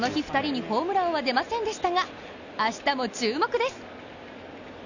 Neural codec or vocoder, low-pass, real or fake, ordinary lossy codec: none; 7.2 kHz; real; none